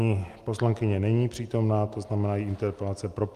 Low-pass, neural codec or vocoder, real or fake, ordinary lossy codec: 14.4 kHz; none; real; Opus, 32 kbps